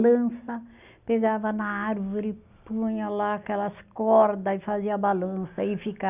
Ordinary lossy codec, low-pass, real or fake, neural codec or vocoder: none; 3.6 kHz; real; none